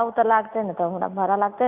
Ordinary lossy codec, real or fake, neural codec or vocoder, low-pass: none; real; none; 3.6 kHz